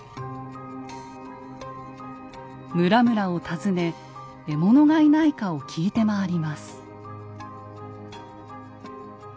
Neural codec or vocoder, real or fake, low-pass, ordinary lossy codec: none; real; none; none